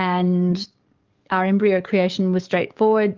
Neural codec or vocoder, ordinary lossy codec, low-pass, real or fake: codec, 44.1 kHz, 7.8 kbps, DAC; Opus, 24 kbps; 7.2 kHz; fake